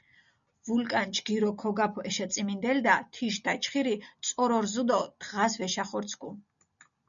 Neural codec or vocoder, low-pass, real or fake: none; 7.2 kHz; real